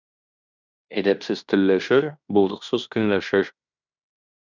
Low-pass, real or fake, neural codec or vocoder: 7.2 kHz; fake; codec, 16 kHz in and 24 kHz out, 0.9 kbps, LongCat-Audio-Codec, fine tuned four codebook decoder